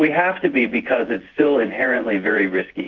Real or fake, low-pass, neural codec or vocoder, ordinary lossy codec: fake; 7.2 kHz; vocoder, 24 kHz, 100 mel bands, Vocos; Opus, 16 kbps